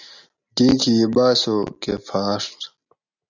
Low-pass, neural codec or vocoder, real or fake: 7.2 kHz; none; real